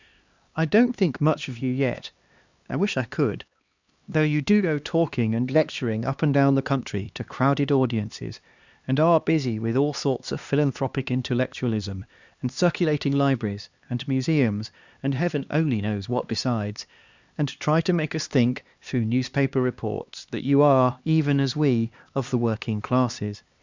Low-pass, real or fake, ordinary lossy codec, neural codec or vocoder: 7.2 kHz; fake; Opus, 64 kbps; codec, 16 kHz, 2 kbps, X-Codec, HuBERT features, trained on LibriSpeech